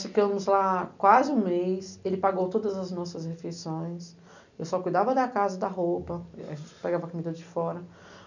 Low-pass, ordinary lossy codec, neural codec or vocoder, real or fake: 7.2 kHz; none; none; real